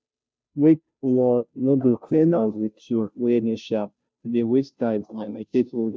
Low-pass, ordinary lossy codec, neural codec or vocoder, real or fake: none; none; codec, 16 kHz, 0.5 kbps, FunCodec, trained on Chinese and English, 25 frames a second; fake